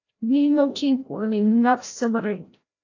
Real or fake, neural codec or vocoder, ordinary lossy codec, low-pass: fake; codec, 16 kHz, 0.5 kbps, FreqCodec, larger model; AAC, 48 kbps; 7.2 kHz